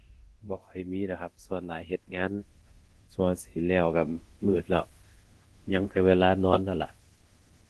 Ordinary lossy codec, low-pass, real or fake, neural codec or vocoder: Opus, 16 kbps; 10.8 kHz; fake; codec, 24 kHz, 0.9 kbps, DualCodec